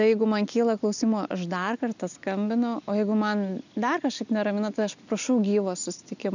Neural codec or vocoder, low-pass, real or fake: none; 7.2 kHz; real